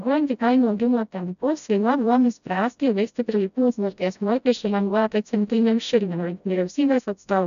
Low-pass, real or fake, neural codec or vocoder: 7.2 kHz; fake; codec, 16 kHz, 0.5 kbps, FreqCodec, smaller model